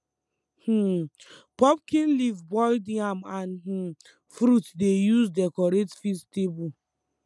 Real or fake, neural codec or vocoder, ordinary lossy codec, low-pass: real; none; none; none